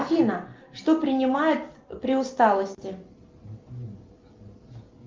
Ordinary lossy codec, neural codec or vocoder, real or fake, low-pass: Opus, 32 kbps; none; real; 7.2 kHz